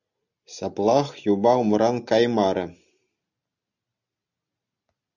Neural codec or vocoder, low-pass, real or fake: none; 7.2 kHz; real